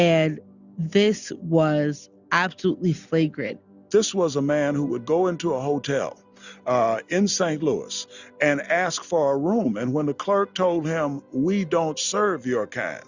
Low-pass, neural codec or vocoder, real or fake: 7.2 kHz; none; real